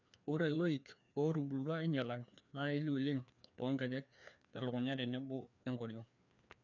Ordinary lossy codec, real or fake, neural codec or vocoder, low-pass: none; fake; codec, 16 kHz, 2 kbps, FreqCodec, larger model; 7.2 kHz